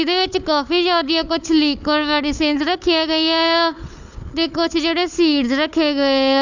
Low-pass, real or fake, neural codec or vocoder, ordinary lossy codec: 7.2 kHz; fake; codec, 16 kHz, 4 kbps, FunCodec, trained on Chinese and English, 50 frames a second; none